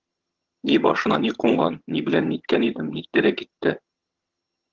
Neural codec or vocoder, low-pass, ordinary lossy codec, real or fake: vocoder, 22.05 kHz, 80 mel bands, HiFi-GAN; 7.2 kHz; Opus, 16 kbps; fake